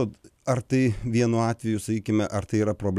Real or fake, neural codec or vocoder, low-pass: real; none; 14.4 kHz